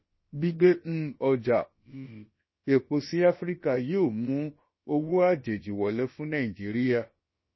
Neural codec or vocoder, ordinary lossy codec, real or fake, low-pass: codec, 16 kHz, about 1 kbps, DyCAST, with the encoder's durations; MP3, 24 kbps; fake; 7.2 kHz